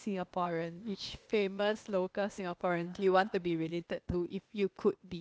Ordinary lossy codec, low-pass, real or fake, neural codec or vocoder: none; none; fake; codec, 16 kHz, 0.8 kbps, ZipCodec